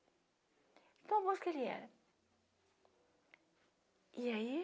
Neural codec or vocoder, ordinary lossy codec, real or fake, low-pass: none; none; real; none